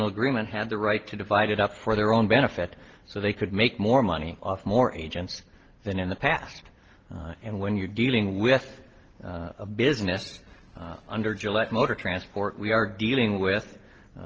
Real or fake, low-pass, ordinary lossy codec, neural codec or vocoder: real; 7.2 kHz; Opus, 16 kbps; none